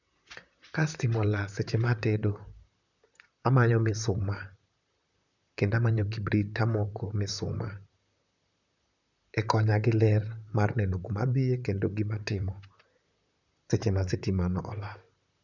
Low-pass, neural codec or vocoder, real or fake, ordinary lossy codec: 7.2 kHz; vocoder, 44.1 kHz, 128 mel bands, Pupu-Vocoder; fake; none